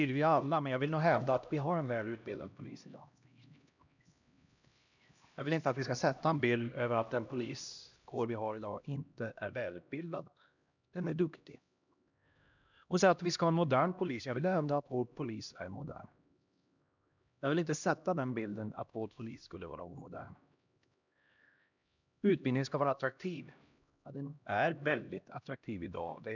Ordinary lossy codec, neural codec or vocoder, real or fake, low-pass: none; codec, 16 kHz, 1 kbps, X-Codec, HuBERT features, trained on LibriSpeech; fake; 7.2 kHz